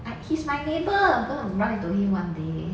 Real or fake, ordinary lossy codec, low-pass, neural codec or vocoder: real; none; none; none